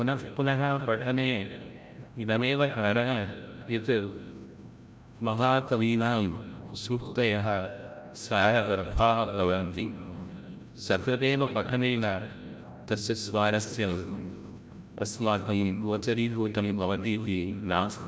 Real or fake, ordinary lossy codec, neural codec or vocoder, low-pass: fake; none; codec, 16 kHz, 0.5 kbps, FreqCodec, larger model; none